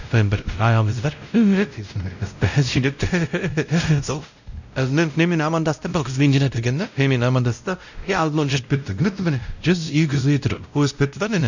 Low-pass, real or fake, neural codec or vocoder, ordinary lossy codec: 7.2 kHz; fake; codec, 16 kHz, 0.5 kbps, X-Codec, WavLM features, trained on Multilingual LibriSpeech; none